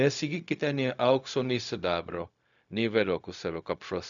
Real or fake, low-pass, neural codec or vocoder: fake; 7.2 kHz; codec, 16 kHz, 0.4 kbps, LongCat-Audio-Codec